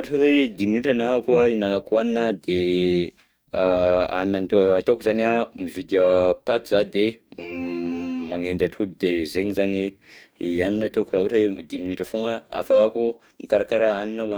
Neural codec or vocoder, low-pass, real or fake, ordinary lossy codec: codec, 44.1 kHz, 2.6 kbps, DAC; none; fake; none